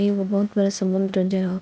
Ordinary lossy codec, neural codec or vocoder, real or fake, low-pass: none; codec, 16 kHz, 0.8 kbps, ZipCodec; fake; none